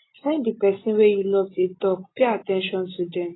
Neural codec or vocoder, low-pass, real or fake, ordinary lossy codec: none; 7.2 kHz; real; AAC, 16 kbps